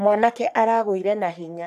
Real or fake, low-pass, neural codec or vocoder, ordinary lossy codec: fake; 14.4 kHz; codec, 44.1 kHz, 3.4 kbps, Pupu-Codec; none